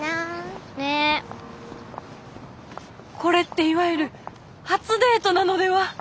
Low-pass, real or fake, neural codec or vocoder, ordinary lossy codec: none; real; none; none